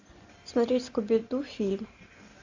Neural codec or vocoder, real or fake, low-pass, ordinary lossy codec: none; real; 7.2 kHz; AAC, 48 kbps